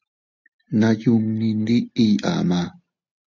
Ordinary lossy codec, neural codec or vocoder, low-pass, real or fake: AAC, 48 kbps; none; 7.2 kHz; real